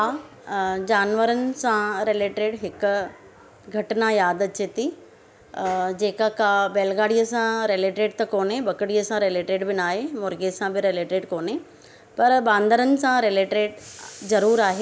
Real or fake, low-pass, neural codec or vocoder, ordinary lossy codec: real; none; none; none